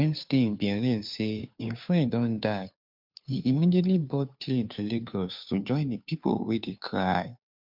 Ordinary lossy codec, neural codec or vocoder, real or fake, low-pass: MP3, 48 kbps; codec, 16 kHz, 2 kbps, FunCodec, trained on Chinese and English, 25 frames a second; fake; 5.4 kHz